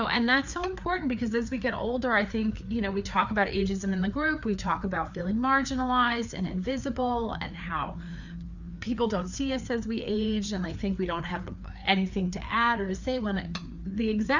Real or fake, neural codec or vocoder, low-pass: fake; codec, 16 kHz, 4 kbps, FreqCodec, larger model; 7.2 kHz